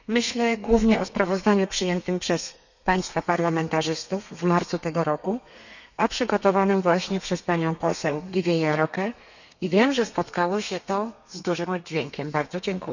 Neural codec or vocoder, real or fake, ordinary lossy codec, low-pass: codec, 32 kHz, 1.9 kbps, SNAC; fake; none; 7.2 kHz